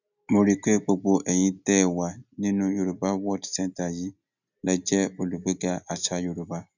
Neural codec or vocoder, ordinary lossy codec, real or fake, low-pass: none; none; real; 7.2 kHz